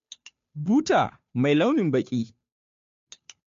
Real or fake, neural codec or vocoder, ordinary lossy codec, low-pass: fake; codec, 16 kHz, 2 kbps, FunCodec, trained on Chinese and English, 25 frames a second; MP3, 48 kbps; 7.2 kHz